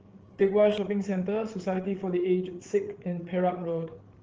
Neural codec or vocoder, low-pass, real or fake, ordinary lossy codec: codec, 16 kHz, 8 kbps, FreqCodec, larger model; 7.2 kHz; fake; Opus, 16 kbps